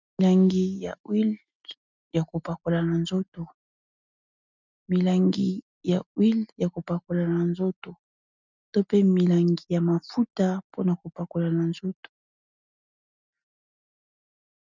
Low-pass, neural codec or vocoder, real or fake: 7.2 kHz; none; real